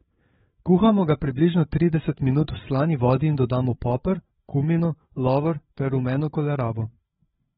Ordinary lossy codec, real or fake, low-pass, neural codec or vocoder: AAC, 16 kbps; fake; 7.2 kHz; codec, 16 kHz, 8 kbps, FreqCodec, larger model